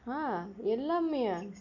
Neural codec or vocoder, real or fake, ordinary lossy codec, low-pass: none; real; none; 7.2 kHz